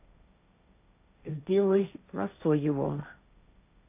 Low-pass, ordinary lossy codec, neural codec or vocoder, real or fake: 3.6 kHz; AAC, 32 kbps; codec, 16 kHz, 1.1 kbps, Voila-Tokenizer; fake